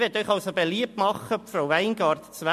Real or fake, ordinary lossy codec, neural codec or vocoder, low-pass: real; MP3, 64 kbps; none; 14.4 kHz